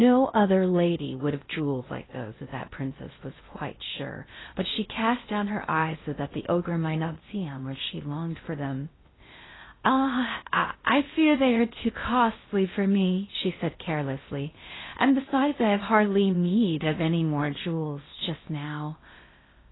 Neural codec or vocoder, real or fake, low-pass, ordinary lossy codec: codec, 16 kHz in and 24 kHz out, 0.6 kbps, FocalCodec, streaming, 4096 codes; fake; 7.2 kHz; AAC, 16 kbps